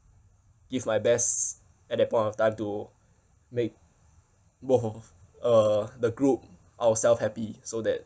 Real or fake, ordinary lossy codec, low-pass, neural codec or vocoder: real; none; none; none